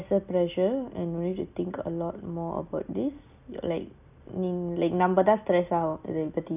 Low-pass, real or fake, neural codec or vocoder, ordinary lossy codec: 3.6 kHz; real; none; none